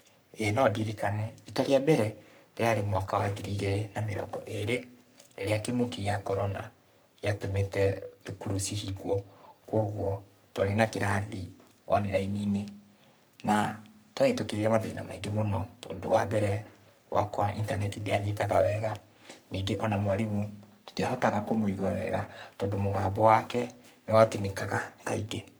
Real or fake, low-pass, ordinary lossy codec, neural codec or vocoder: fake; none; none; codec, 44.1 kHz, 3.4 kbps, Pupu-Codec